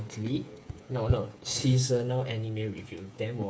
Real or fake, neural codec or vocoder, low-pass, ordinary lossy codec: fake; codec, 16 kHz, 8 kbps, FreqCodec, smaller model; none; none